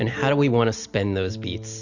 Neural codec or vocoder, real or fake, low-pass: none; real; 7.2 kHz